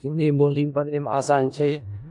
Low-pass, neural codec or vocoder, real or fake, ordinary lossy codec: 10.8 kHz; codec, 16 kHz in and 24 kHz out, 0.4 kbps, LongCat-Audio-Codec, four codebook decoder; fake; AAC, 64 kbps